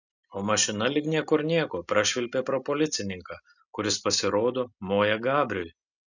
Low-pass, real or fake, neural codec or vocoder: 7.2 kHz; real; none